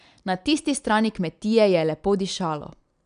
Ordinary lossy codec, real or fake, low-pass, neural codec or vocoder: none; real; 9.9 kHz; none